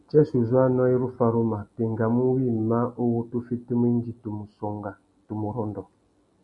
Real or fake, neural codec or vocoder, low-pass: real; none; 10.8 kHz